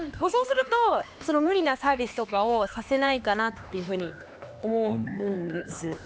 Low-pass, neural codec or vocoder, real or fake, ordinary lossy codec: none; codec, 16 kHz, 2 kbps, X-Codec, HuBERT features, trained on LibriSpeech; fake; none